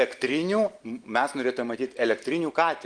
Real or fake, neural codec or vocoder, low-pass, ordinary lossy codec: real; none; 9.9 kHz; Opus, 24 kbps